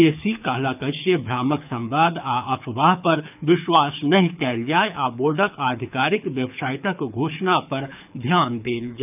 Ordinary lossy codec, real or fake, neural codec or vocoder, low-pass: none; fake; codec, 24 kHz, 6 kbps, HILCodec; 3.6 kHz